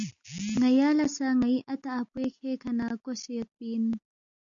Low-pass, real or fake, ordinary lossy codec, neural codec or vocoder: 7.2 kHz; real; AAC, 64 kbps; none